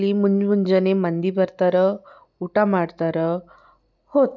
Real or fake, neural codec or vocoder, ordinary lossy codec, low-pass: real; none; none; 7.2 kHz